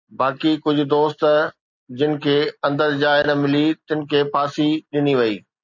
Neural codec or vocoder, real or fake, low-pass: none; real; 7.2 kHz